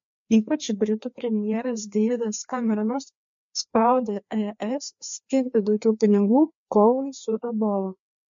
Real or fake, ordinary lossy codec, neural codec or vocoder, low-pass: fake; MP3, 48 kbps; codec, 16 kHz, 2 kbps, FreqCodec, larger model; 7.2 kHz